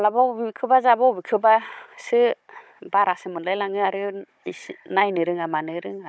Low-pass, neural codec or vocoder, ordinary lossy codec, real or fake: none; codec, 16 kHz, 16 kbps, FunCodec, trained on Chinese and English, 50 frames a second; none; fake